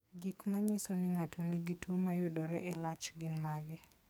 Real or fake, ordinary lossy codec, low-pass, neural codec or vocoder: fake; none; none; codec, 44.1 kHz, 2.6 kbps, SNAC